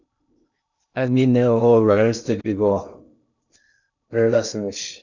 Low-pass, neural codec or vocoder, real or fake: 7.2 kHz; codec, 16 kHz in and 24 kHz out, 0.6 kbps, FocalCodec, streaming, 2048 codes; fake